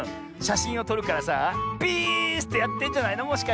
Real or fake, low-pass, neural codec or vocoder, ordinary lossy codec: real; none; none; none